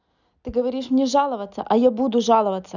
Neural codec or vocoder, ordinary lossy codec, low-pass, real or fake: none; none; 7.2 kHz; real